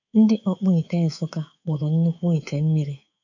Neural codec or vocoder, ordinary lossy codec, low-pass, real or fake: codec, 24 kHz, 3.1 kbps, DualCodec; none; 7.2 kHz; fake